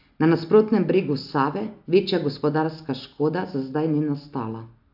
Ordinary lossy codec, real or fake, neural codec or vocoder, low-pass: AAC, 48 kbps; real; none; 5.4 kHz